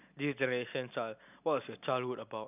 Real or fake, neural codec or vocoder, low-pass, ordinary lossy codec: real; none; 3.6 kHz; none